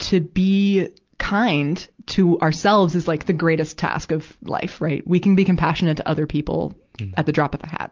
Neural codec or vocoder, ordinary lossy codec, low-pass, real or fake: none; Opus, 24 kbps; 7.2 kHz; real